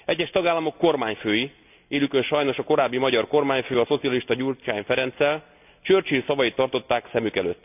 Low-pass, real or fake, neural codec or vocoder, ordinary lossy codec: 3.6 kHz; real; none; none